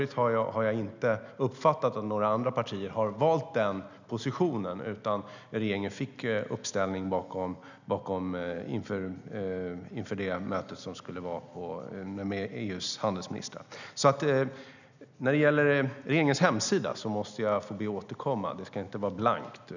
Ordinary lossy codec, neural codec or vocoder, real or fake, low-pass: none; none; real; 7.2 kHz